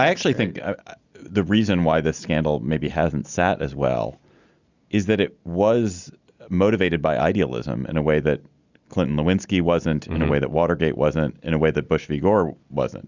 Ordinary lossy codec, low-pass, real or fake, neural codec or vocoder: Opus, 64 kbps; 7.2 kHz; real; none